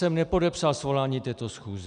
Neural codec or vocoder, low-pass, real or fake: none; 9.9 kHz; real